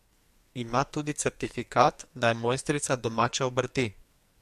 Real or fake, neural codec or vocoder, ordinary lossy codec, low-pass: fake; codec, 44.1 kHz, 2.6 kbps, SNAC; MP3, 64 kbps; 14.4 kHz